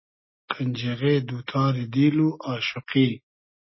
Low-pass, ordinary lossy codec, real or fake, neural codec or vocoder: 7.2 kHz; MP3, 24 kbps; real; none